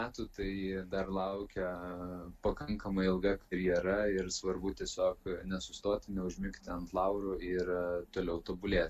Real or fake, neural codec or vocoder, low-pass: real; none; 14.4 kHz